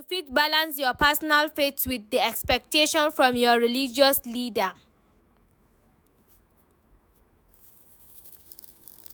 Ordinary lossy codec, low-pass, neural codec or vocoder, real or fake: none; none; autoencoder, 48 kHz, 128 numbers a frame, DAC-VAE, trained on Japanese speech; fake